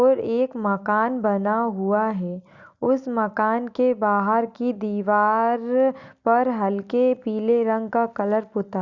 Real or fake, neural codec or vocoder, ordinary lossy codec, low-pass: real; none; Opus, 64 kbps; 7.2 kHz